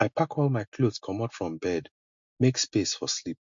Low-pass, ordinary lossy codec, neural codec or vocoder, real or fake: 7.2 kHz; MP3, 48 kbps; none; real